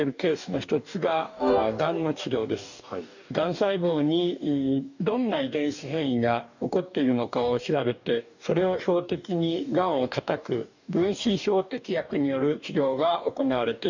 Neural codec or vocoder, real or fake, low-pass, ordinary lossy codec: codec, 44.1 kHz, 2.6 kbps, DAC; fake; 7.2 kHz; none